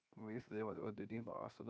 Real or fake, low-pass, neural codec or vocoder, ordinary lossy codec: fake; none; codec, 16 kHz, 0.7 kbps, FocalCodec; none